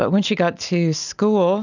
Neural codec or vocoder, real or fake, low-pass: none; real; 7.2 kHz